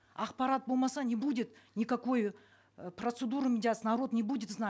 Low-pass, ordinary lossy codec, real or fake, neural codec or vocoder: none; none; real; none